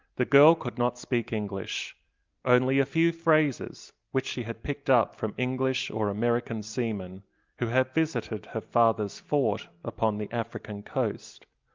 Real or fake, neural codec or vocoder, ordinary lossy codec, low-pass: real; none; Opus, 24 kbps; 7.2 kHz